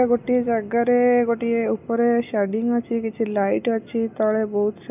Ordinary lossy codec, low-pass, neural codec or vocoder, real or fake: none; 3.6 kHz; none; real